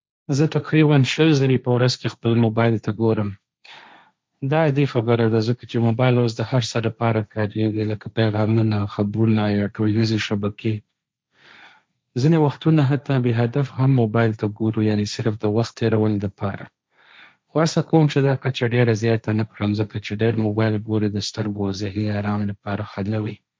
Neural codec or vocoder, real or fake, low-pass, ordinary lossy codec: codec, 16 kHz, 1.1 kbps, Voila-Tokenizer; fake; none; none